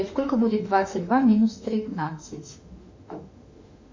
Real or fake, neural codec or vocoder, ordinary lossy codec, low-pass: fake; autoencoder, 48 kHz, 32 numbers a frame, DAC-VAE, trained on Japanese speech; MP3, 64 kbps; 7.2 kHz